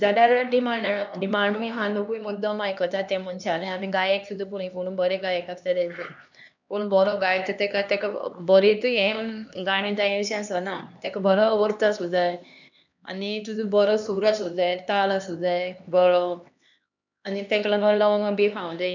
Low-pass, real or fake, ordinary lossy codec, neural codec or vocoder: 7.2 kHz; fake; none; codec, 16 kHz, 2 kbps, X-Codec, HuBERT features, trained on LibriSpeech